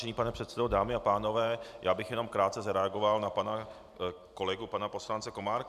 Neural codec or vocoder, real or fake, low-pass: none; real; 14.4 kHz